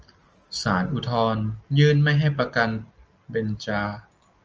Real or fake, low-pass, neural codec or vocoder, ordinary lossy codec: real; 7.2 kHz; none; Opus, 24 kbps